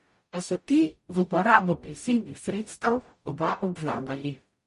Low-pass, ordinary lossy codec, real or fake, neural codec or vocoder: 14.4 kHz; MP3, 48 kbps; fake; codec, 44.1 kHz, 0.9 kbps, DAC